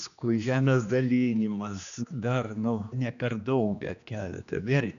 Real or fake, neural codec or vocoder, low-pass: fake; codec, 16 kHz, 2 kbps, X-Codec, HuBERT features, trained on general audio; 7.2 kHz